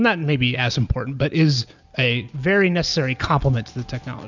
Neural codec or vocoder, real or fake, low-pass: none; real; 7.2 kHz